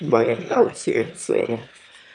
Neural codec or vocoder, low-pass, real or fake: autoencoder, 22.05 kHz, a latent of 192 numbers a frame, VITS, trained on one speaker; 9.9 kHz; fake